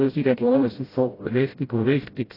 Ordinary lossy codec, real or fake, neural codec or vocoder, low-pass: AAC, 24 kbps; fake; codec, 16 kHz, 0.5 kbps, FreqCodec, smaller model; 5.4 kHz